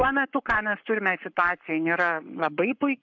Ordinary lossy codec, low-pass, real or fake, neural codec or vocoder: MP3, 64 kbps; 7.2 kHz; fake; codec, 44.1 kHz, 7.8 kbps, Pupu-Codec